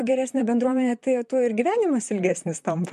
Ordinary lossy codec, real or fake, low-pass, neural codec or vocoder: MP3, 64 kbps; fake; 14.4 kHz; vocoder, 44.1 kHz, 128 mel bands, Pupu-Vocoder